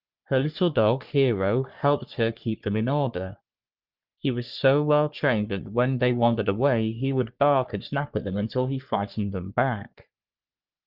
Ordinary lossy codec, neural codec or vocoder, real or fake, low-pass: Opus, 24 kbps; codec, 44.1 kHz, 3.4 kbps, Pupu-Codec; fake; 5.4 kHz